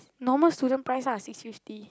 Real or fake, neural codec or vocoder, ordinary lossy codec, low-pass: real; none; none; none